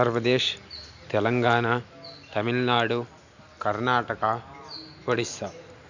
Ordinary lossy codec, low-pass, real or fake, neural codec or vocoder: none; 7.2 kHz; real; none